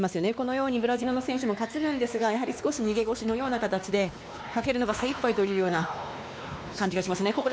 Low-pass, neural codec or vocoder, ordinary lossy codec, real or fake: none; codec, 16 kHz, 2 kbps, X-Codec, WavLM features, trained on Multilingual LibriSpeech; none; fake